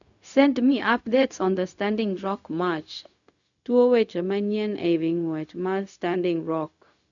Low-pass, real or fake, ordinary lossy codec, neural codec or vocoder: 7.2 kHz; fake; none; codec, 16 kHz, 0.4 kbps, LongCat-Audio-Codec